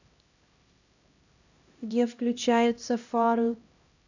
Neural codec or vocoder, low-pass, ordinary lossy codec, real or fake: codec, 16 kHz, 1 kbps, X-Codec, HuBERT features, trained on LibriSpeech; 7.2 kHz; none; fake